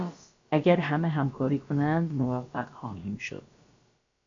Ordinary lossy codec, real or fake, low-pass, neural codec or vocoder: MP3, 64 kbps; fake; 7.2 kHz; codec, 16 kHz, about 1 kbps, DyCAST, with the encoder's durations